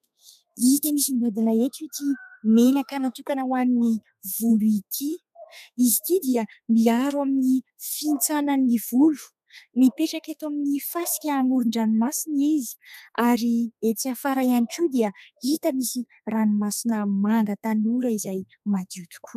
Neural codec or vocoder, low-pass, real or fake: codec, 32 kHz, 1.9 kbps, SNAC; 14.4 kHz; fake